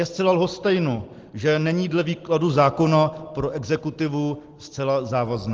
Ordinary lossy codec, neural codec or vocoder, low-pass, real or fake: Opus, 16 kbps; none; 7.2 kHz; real